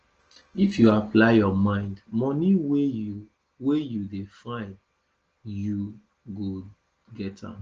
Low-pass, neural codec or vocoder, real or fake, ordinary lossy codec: 7.2 kHz; none; real; Opus, 16 kbps